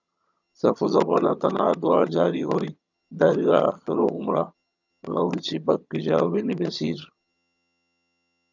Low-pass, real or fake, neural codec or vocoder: 7.2 kHz; fake; vocoder, 22.05 kHz, 80 mel bands, HiFi-GAN